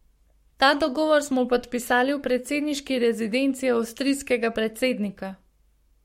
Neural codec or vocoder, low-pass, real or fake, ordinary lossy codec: codec, 44.1 kHz, 7.8 kbps, Pupu-Codec; 19.8 kHz; fake; MP3, 64 kbps